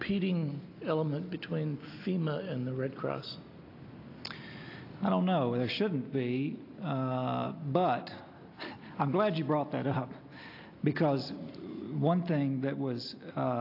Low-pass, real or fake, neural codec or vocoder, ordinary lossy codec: 5.4 kHz; real; none; AAC, 32 kbps